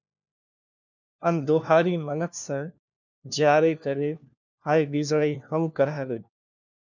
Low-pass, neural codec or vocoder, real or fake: 7.2 kHz; codec, 16 kHz, 1 kbps, FunCodec, trained on LibriTTS, 50 frames a second; fake